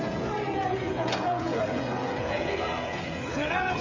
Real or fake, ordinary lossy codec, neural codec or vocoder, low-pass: fake; MP3, 48 kbps; codec, 16 kHz, 16 kbps, FreqCodec, smaller model; 7.2 kHz